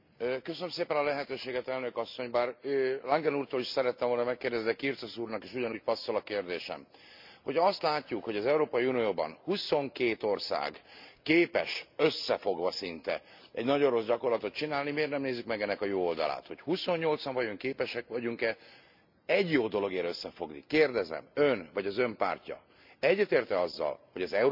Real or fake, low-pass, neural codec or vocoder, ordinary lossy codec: real; 5.4 kHz; none; MP3, 48 kbps